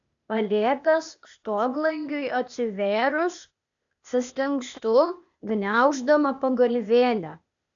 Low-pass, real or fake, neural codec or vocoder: 7.2 kHz; fake; codec, 16 kHz, 0.8 kbps, ZipCodec